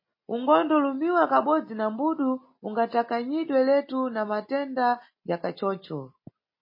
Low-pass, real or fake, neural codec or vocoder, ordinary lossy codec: 5.4 kHz; real; none; MP3, 24 kbps